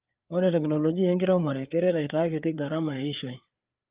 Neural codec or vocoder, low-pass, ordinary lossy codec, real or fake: codec, 16 kHz, 4 kbps, FreqCodec, larger model; 3.6 kHz; Opus, 32 kbps; fake